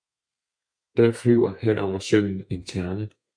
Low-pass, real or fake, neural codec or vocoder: 9.9 kHz; fake; codec, 32 kHz, 1.9 kbps, SNAC